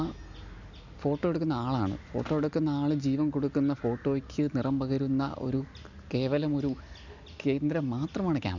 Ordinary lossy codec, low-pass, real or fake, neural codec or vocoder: none; 7.2 kHz; real; none